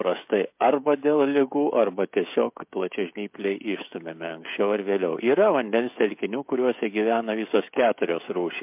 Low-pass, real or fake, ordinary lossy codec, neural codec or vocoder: 3.6 kHz; fake; MP3, 32 kbps; codec, 16 kHz, 16 kbps, FreqCodec, smaller model